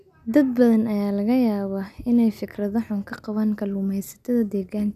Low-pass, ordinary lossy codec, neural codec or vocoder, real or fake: 14.4 kHz; none; none; real